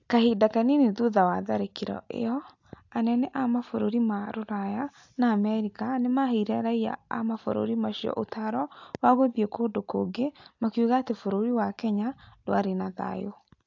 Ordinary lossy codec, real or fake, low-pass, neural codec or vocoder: AAC, 48 kbps; real; 7.2 kHz; none